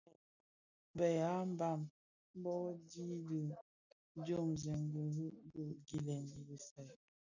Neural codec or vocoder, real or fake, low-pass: none; real; 7.2 kHz